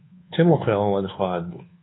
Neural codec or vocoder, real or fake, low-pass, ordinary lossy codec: codec, 24 kHz, 1.2 kbps, DualCodec; fake; 7.2 kHz; AAC, 16 kbps